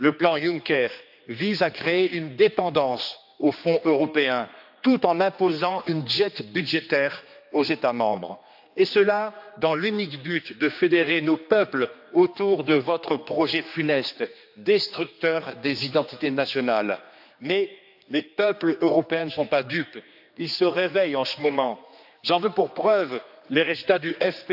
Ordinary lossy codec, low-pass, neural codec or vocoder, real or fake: AAC, 48 kbps; 5.4 kHz; codec, 16 kHz, 2 kbps, X-Codec, HuBERT features, trained on general audio; fake